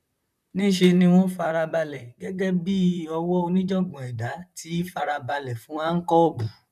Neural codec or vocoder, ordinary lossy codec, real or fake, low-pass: vocoder, 44.1 kHz, 128 mel bands, Pupu-Vocoder; none; fake; 14.4 kHz